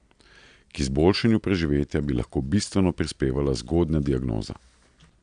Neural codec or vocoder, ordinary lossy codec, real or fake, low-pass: none; none; real; 9.9 kHz